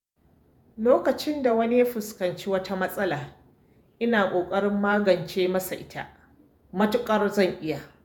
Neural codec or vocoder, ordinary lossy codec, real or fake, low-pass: vocoder, 48 kHz, 128 mel bands, Vocos; none; fake; none